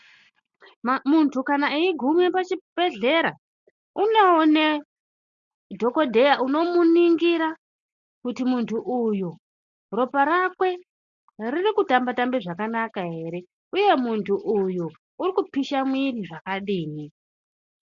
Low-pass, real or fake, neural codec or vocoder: 7.2 kHz; real; none